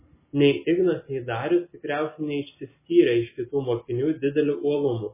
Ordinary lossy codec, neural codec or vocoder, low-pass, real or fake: MP3, 16 kbps; none; 3.6 kHz; real